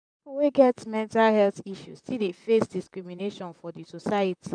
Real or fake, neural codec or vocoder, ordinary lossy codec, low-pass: fake; vocoder, 44.1 kHz, 128 mel bands, Pupu-Vocoder; none; 9.9 kHz